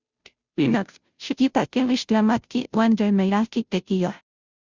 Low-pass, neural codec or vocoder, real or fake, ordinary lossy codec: 7.2 kHz; codec, 16 kHz, 0.5 kbps, FunCodec, trained on Chinese and English, 25 frames a second; fake; Opus, 64 kbps